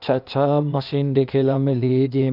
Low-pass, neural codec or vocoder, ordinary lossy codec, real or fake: 5.4 kHz; codec, 16 kHz, 0.8 kbps, ZipCodec; none; fake